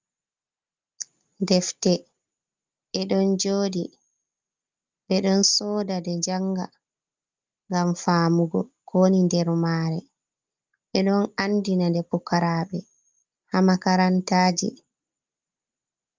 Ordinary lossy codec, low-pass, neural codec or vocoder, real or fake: Opus, 32 kbps; 7.2 kHz; none; real